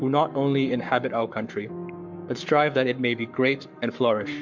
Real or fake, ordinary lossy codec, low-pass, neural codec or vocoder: fake; MP3, 64 kbps; 7.2 kHz; codec, 44.1 kHz, 7.8 kbps, Pupu-Codec